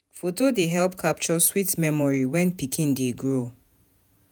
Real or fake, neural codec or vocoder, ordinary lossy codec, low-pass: fake; vocoder, 48 kHz, 128 mel bands, Vocos; none; none